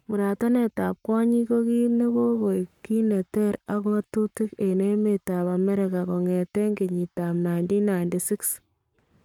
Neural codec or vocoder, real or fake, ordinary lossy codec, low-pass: codec, 44.1 kHz, 7.8 kbps, Pupu-Codec; fake; none; 19.8 kHz